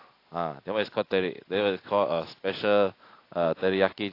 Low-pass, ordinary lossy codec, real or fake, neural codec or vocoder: 5.4 kHz; AAC, 32 kbps; real; none